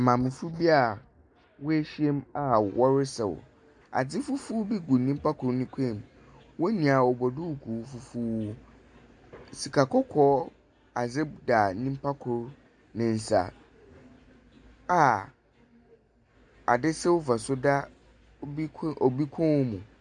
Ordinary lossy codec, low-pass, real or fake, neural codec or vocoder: MP3, 96 kbps; 9.9 kHz; real; none